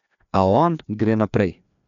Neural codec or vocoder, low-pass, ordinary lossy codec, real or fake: codec, 16 kHz, 2 kbps, FreqCodec, larger model; 7.2 kHz; none; fake